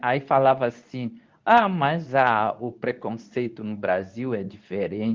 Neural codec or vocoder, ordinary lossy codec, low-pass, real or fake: codec, 24 kHz, 0.9 kbps, WavTokenizer, medium speech release version 2; Opus, 32 kbps; 7.2 kHz; fake